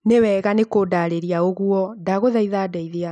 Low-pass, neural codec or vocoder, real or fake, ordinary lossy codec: 10.8 kHz; none; real; none